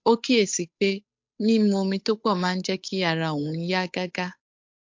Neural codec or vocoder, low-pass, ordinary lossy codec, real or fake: codec, 16 kHz, 8 kbps, FunCodec, trained on Chinese and English, 25 frames a second; 7.2 kHz; MP3, 48 kbps; fake